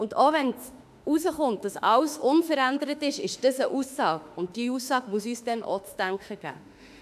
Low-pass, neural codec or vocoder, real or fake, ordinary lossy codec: 14.4 kHz; autoencoder, 48 kHz, 32 numbers a frame, DAC-VAE, trained on Japanese speech; fake; none